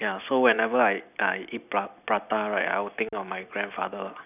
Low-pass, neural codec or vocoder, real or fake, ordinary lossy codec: 3.6 kHz; vocoder, 44.1 kHz, 128 mel bands every 256 samples, BigVGAN v2; fake; none